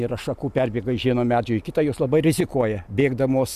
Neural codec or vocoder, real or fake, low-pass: none; real; 14.4 kHz